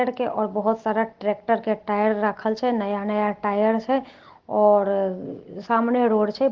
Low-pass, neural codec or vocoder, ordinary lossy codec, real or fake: 7.2 kHz; none; Opus, 16 kbps; real